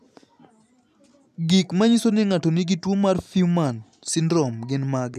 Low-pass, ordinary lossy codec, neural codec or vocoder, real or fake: 14.4 kHz; none; none; real